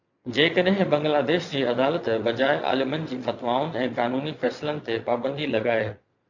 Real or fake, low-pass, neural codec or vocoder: fake; 7.2 kHz; vocoder, 22.05 kHz, 80 mel bands, Vocos